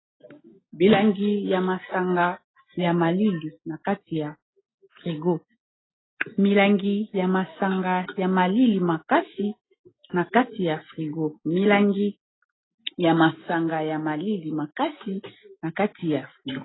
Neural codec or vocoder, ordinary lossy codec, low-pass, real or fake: none; AAC, 16 kbps; 7.2 kHz; real